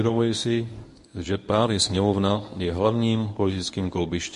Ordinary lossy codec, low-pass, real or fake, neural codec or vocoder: MP3, 48 kbps; 10.8 kHz; fake; codec, 24 kHz, 0.9 kbps, WavTokenizer, medium speech release version 1